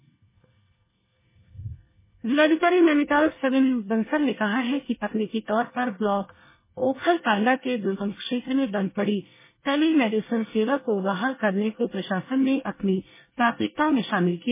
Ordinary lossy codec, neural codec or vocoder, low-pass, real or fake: MP3, 16 kbps; codec, 24 kHz, 1 kbps, SNAC; 3.6 kHz; fake